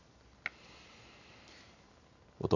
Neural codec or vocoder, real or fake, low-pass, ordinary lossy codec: none; real; 7.2 kHz; none